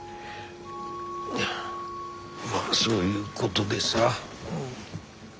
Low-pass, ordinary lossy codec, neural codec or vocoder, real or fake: none; none; none; real